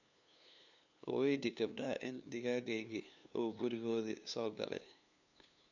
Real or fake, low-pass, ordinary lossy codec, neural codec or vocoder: fake; 7.2 kHz; none; codec, 16 kHz, 2 kbps, FunCodec, trained on LibriTTS, 25 frames a second